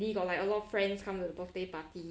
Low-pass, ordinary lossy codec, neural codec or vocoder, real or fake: none; none; none; real